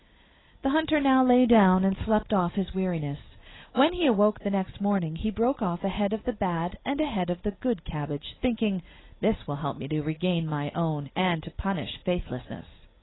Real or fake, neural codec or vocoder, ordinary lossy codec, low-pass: real; none; AAC, 16 kbps; 7.2 kHz